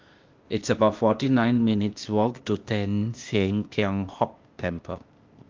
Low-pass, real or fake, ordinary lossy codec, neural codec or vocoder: 7.2 kHz; fake; Opus, 32 kbps; codec, 16 kHz, 0.8 kbps, ZipCodec